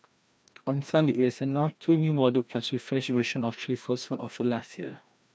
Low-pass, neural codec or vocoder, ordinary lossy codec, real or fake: none; codec, 16 kHz, 1 kbps, FreqCodec, larger model; none; fake